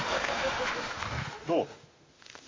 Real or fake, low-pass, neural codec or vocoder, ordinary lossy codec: fake; 7.2 kHz; autoencoder, 48 kHz, 32 numbers a frame, DAC-VAE, trained on Japanese speech; MP3, 32 kbps